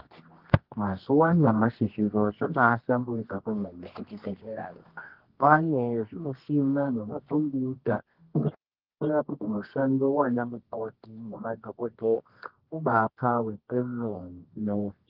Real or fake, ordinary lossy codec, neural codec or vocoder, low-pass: fake; Opus, 16 kbps; codec, 24 kHz, 0.9 kbps, WavTokenizer, medium music audio release; 5.4 kHz